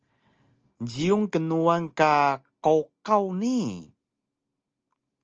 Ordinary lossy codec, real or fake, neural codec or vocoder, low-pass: Opus, 24 kbps; real; none; 7.2 kHz